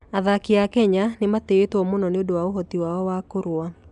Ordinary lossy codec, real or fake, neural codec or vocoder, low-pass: none; real; none; 10.8 kHz